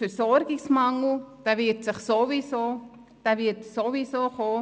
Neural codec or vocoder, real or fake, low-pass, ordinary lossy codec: none; real; none; none